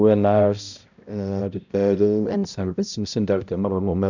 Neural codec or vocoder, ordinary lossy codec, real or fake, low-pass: codec, 16 kHz, 0.5 kbps, X-Codec, HuBERT features, trained on balanced general audio; none; fake; 7.2 kHz